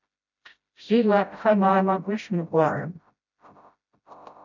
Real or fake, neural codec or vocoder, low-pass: fake; codec, 16 kHz, 0.5 kbps, FreqCodec, smaller model; 7.2 kHz